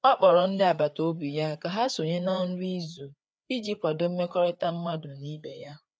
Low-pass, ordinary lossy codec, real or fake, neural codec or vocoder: none; none; fake; codec, 16 kHz, 4 kbps, FreqCodec, larger model